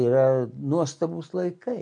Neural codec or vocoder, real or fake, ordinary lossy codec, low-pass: none; real; MP3, 64 kbps; 9.9 kHz